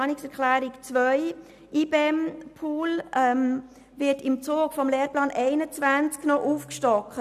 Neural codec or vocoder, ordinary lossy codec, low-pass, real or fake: none; none; 14.4 kHz; real